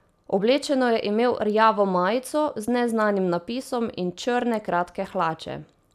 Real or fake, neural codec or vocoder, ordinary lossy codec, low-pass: real; none; none; 14.4 kHz